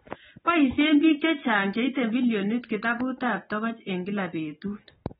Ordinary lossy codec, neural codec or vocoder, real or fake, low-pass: AAC, 16 kbps; none; real; 19.8 kHz